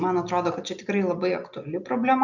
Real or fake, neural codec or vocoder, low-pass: real; none; 7.2 kHz